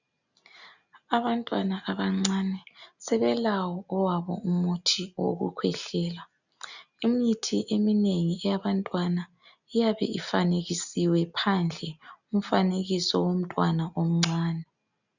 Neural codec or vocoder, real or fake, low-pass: none; real; 7.2 kHz